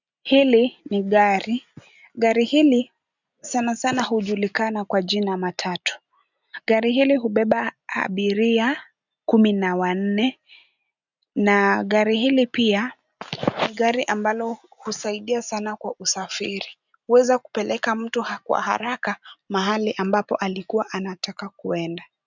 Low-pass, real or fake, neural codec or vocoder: 7.2 kHz; real; none